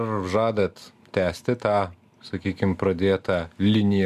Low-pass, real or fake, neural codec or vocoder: 14.4 kHz; real; none